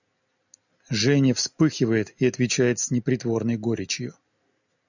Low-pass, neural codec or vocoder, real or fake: 7.2 kHz; none; real